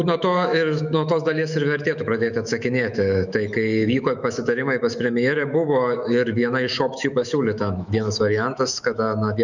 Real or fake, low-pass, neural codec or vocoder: fake; 7.2 kHz; autoencoder, 48 kHz, 128 numbers a frame, DAC-VAE, trained on Japanese speech